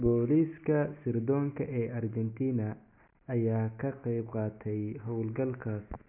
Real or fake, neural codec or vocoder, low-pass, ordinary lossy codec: real; none; 3.6 kHz; none